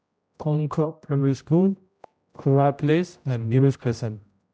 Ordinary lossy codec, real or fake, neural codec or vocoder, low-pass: none; fake; codec, 16 kHz, 0.5 kbps, X-Codec, HuBERT features, trained on general audio; none